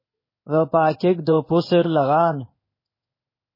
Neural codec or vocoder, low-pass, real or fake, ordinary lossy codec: codec, 16 kHz in and 24 kHz out, 1 kbps, XY-Tokenizer; 5.4 kHz; fake; MP3, 24 kbps